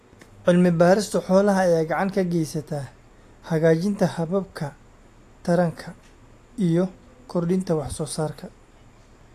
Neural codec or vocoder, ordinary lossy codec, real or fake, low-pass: none; AAC, 64 kbps; real; 14.4 kHz